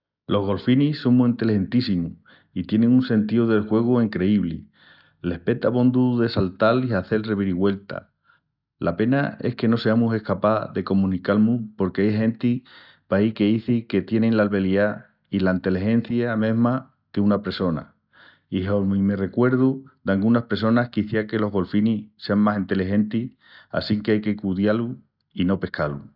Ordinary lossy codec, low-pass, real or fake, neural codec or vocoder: none; 5.4 kHz; real; none